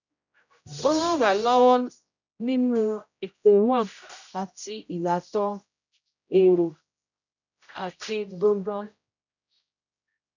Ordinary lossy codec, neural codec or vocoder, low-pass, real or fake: none; codec, 16 kHz, 0.5 kbps, X-Codec, HuBERT features, trained on general audio; 7.2 kHz; fake